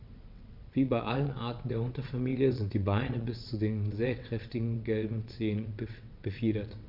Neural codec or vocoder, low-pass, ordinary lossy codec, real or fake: vocoder, 22.05 kHz, 80 mel bands, WaveNeXt; 5.4 kHz; none; fake